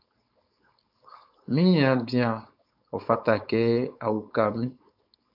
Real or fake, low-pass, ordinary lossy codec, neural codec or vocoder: fake; 5.4 kHz; AAC, 48 kbps; codec, 16 kHz, 4.8 kbps, FACodec